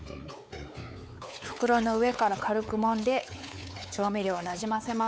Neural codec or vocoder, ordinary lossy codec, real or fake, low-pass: codec, 16 kHz, 4 kbps, X-Codec, WavLM features, trained on Multilingual LibriSpeech; none; fake; none